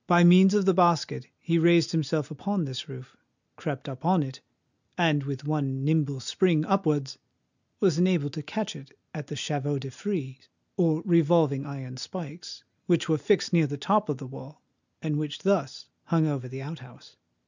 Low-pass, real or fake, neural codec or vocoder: 7.2 kHz; real; none